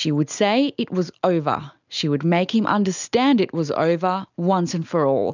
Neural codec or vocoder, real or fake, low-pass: none; real; 7.2 kHz